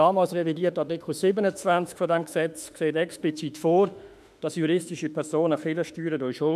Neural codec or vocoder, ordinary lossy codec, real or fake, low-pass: autoencoder, 48 kHz, 32 numbers a frame, DAC-VAE, trained on Japanese speech; none; fake; 14.4 kHz